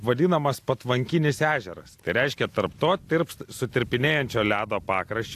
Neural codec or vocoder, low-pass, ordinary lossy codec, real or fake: none; 14.4 kHz; AAC, 64 kbps; real